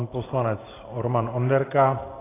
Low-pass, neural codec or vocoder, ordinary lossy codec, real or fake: 3.6 kHz; none; AAC, 16 kbps; real